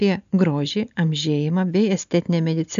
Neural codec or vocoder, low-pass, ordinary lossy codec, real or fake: none; 7.2 kHz; AAC, 96 kbps; real